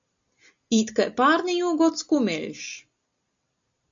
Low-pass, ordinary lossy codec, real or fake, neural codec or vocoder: 7.2 kHz; AAC, 64 kbps; real; none